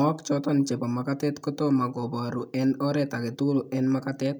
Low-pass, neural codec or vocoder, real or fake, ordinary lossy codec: 19.8 kHz; none; real; none